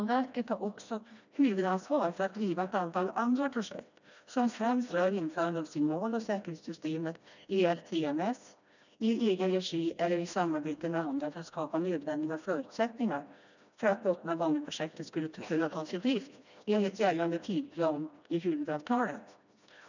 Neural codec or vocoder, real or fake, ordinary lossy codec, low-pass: codec, 16 kHz, 1 kbps, FreqCodec, smaller model; fake; none; 7.2 kHz